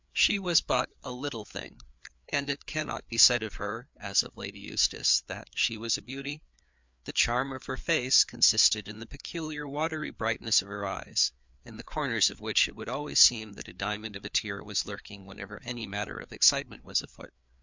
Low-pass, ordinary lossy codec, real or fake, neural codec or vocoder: 7.2 kHz; MP3, 64 kbps; fake; codec, 16 kHz, 4 kbps, FreqCodec, larger model